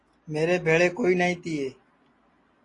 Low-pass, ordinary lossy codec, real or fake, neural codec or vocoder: 10.8 kHz; AAC, 32 kbps; real; none